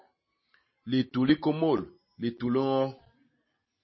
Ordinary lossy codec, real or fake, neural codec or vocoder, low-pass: MP3, 24 kbps; real; none; 7.2 kHz